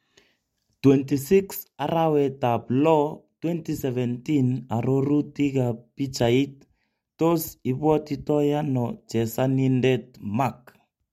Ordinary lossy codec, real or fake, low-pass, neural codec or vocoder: MP3, 64 kbps; real; 19.8 kHz; none